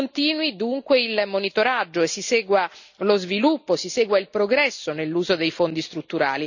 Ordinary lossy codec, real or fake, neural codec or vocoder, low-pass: none; real; none; 7.2 kHz